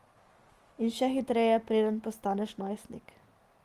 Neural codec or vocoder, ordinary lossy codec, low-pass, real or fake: none; Opus, 24 kbps; 14.4 kHz; real